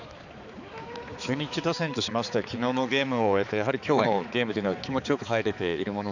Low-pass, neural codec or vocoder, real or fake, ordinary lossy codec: 7.2 kHz; codec, 16 kHz, 4 kbps, X-Codec, HuBERT features, trained on balanced general audio; fake; none